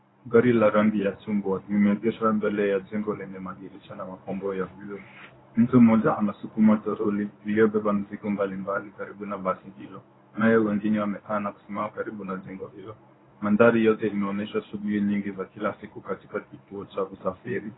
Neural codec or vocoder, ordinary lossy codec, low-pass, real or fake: codec, 24 kHz, 0.9 kbps, WavTokenizer, medium speech release version 1; AAC, 16 kbps; 7.2 kHz; fake